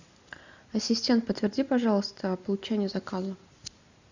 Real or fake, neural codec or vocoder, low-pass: real; none; 7.2 kHz